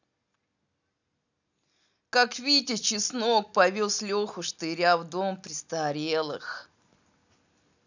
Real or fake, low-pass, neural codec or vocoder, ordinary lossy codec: real; 7.2 kHz; none; none